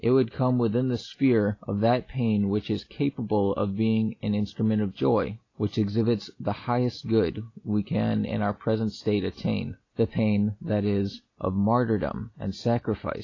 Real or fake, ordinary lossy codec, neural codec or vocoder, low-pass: real; AAC, 32 kbps; none; 7.2 kHz